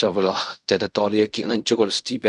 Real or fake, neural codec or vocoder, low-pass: fake; codec, 16 kHz in and 24 kHz out, 0.4 kbps, LongCat-Audio-Codec, fine tuned four codebook decoder; 10.8 kHz